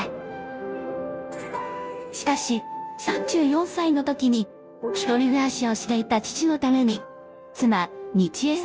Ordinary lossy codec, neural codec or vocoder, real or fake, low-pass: none; codec, 16 kHz, 0.5 kbps, FunCodec, trained on Chinese and English, 25 frames a second; fake; none